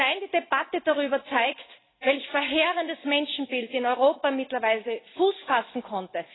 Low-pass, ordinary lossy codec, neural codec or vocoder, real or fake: 7.2 kHz; AAC, 16 kbps; none; real